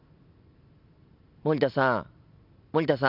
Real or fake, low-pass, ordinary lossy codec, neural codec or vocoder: real; 5.4 kHz; none; none